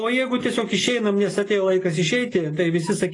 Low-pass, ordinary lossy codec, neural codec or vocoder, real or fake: 10.8 kHz; AAC, 32 kbps; none; real